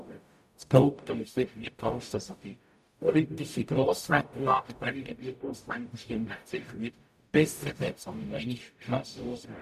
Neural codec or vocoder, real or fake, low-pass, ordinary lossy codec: codec, 44.1 kHz, 0.9 kbps, DAC; fake; 14.4 kHz; none